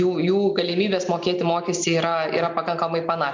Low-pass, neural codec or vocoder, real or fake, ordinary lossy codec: 7.2 kHz; none; real; MP3, 64 kbps